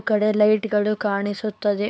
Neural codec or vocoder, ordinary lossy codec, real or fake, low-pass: codec, 16 kHz, 4 kbps, X-Codec, HuBERT features, trained on LibriSpeech; none; fake; none